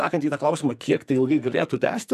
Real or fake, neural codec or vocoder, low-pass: fake; codec, 44.1 kHz, 2.6 kbps, SNAC; 14.4 kHz